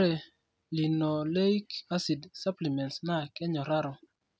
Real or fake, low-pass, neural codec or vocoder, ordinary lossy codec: real; none; none; none